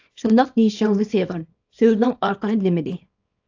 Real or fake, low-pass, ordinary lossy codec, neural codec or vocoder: fake; 7.2 kHz; AAC, 48 kbps; codec, 24 kHz, 0.9 kbps, WavTokenizer, small release